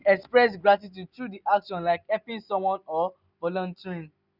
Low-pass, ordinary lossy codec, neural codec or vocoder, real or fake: 5.4 kHz; none; none; real